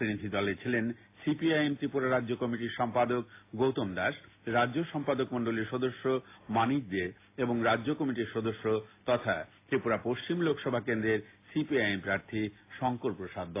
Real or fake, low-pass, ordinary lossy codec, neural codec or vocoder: real; 3.6 kHz; AAC, 24 kbps; none